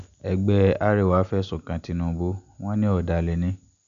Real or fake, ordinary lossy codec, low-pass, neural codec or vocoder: real; AAC, 96 kbps; 7.2 kHz; none